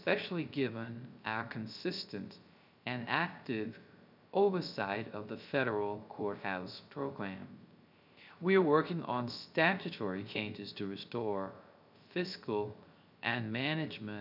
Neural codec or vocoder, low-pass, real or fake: codec, 16 kHz, 0.3 kbps, FocalCodec; 5.4 kHz; fake